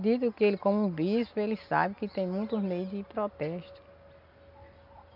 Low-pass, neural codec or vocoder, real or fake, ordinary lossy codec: 5.4 kHz; none; real; none